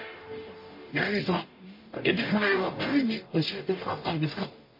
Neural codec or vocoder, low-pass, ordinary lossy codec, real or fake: codec, 44.1 kHz, 0.9 kbps, DAC; 5.4 kHz; MP3, 24 kbps; fake